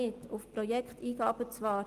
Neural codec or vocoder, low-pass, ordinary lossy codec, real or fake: none; 14.4 kHz; Opus, 24 kbps; real